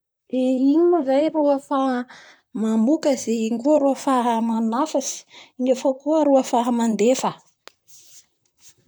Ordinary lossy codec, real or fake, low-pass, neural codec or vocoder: none; fake; none; vocoder, 44.1 kHz, 128 mel bands, Pupu-Vocoder